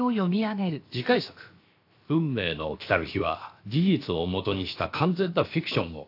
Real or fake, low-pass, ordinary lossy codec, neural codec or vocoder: fake; 5.4 kHz; AAC, 32 kbps; codec, 16 kHz, about 1 kbps, DyCAST, with the encoder's durations